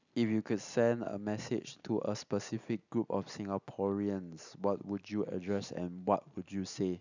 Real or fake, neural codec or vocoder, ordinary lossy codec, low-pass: real; none; none; 7.2 kHz